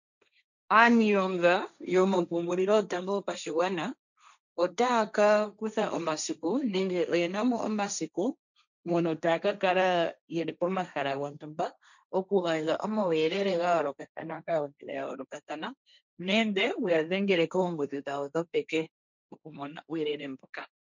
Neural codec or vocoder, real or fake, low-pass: codec, 16 kHz, 1.1 kbps, Voila-Tokenizer; fake; 7.2 kHz